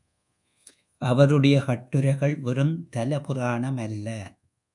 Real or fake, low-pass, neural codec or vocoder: fake; 10.8 kHz; codec, 24 kHz, 1.2 kbps, DualCodec